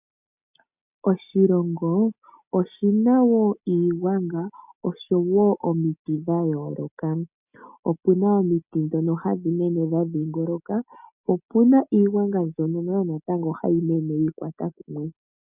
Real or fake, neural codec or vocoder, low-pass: real; none; 3.6 kHz